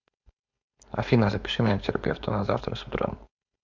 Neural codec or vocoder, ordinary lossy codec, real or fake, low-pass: codec, 16 kHz, 4.8 kbps, FACodec; MP3, 64 kbps; fake; 7.2 kHz